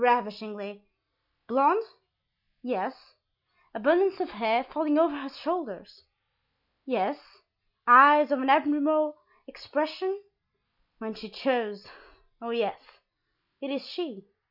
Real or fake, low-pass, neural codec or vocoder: real; 5.4 kHz; none